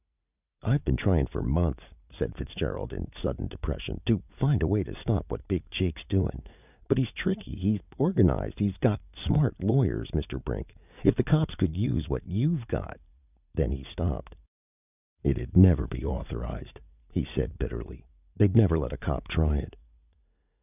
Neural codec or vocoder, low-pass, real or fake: vocoder, 44.1 kHz, 80 mel bands, Vocos; 3.6 kHz; fake